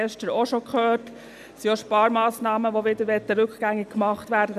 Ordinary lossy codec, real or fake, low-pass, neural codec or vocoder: none; real; 14.4 kHz; none